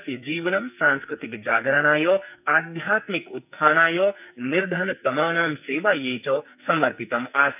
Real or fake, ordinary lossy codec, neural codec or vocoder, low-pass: fake; none; codec, 44.1 kHz, 2.6 kbps, SNAC; 3.6 kHz